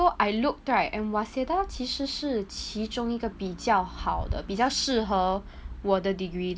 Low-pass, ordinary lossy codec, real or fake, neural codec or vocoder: none; none; real; none